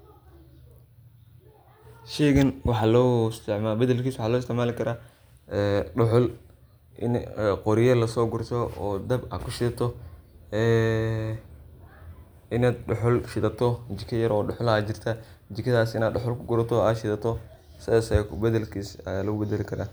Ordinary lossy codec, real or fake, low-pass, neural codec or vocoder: none; real; none; none